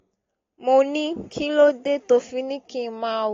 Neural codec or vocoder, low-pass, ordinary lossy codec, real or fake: none; 7.2 kHz; AAC, 32 kbps; real